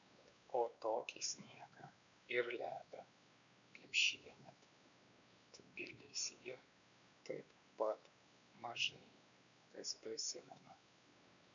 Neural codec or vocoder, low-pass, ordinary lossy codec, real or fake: codec, 16 kHz, 2 kbps, X-Codec, WavLM features, trained on Multilingual LibriSpeech; 7.2 kHz; MP3, 96 kbps; fake